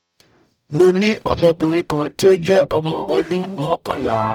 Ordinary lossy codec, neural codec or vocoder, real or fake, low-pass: none; codec, 44.1 kHz, 0.9 kbps, DAC; fake; 19.8 kHz